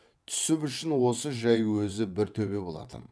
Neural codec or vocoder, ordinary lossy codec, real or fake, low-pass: vocoder, 22.05 kHz, 80 mel bands, WaveNeXt; none; fake; none